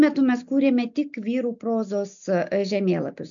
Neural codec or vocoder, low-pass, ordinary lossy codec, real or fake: none; 7.2 kHz; MP3, 64 kbps; real